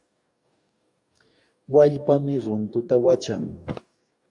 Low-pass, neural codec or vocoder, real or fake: 10.8 kHz; codec, 44.1 kHz, 2.6 kbps, DAC; fake